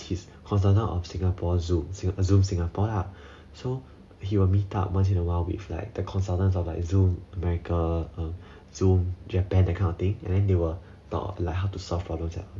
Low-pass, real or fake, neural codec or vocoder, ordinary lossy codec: none; real; none; none